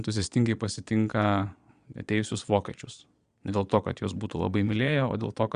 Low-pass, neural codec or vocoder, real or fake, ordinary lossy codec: 9.9 kHz; vocoder, 22.05 kHz, 80 mel bands, Vocos; fake; Opus, 64 kbps